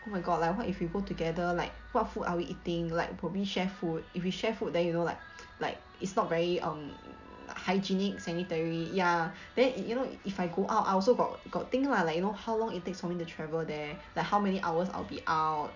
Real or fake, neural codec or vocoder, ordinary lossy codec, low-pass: real; none; none; 7.2 kHz